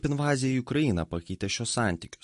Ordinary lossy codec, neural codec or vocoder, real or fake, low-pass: MP3, 48 kbps; none; real; 14.4 kHz